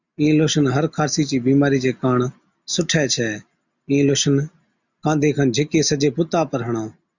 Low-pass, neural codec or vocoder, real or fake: 7.2 kHz; none; real